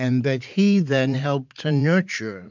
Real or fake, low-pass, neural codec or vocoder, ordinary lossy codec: fake; 7.2 kHz; vocoder, 44.1 kHz, 80 mel bands, Vocos; MP3, 64 kbps